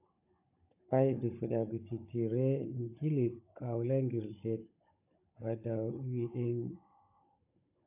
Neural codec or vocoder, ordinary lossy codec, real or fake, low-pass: codec, 16 kHz, 16 kbps, FunCodec, trained on Chinese and English, 50 frames a second; AAC, 24 kbps; fake; 3.6 kHz